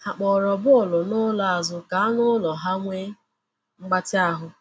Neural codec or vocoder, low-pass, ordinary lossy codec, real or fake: none; none; none; real